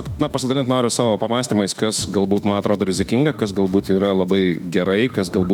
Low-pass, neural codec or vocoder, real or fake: 19.8 kHz; autoencoder, 48 kHz, 32 numbers a frame, DAC-VAE, trained on Japanese speech; fake